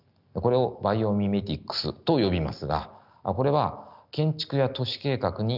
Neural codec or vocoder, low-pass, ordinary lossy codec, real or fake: none; 5.4 kHz; none; real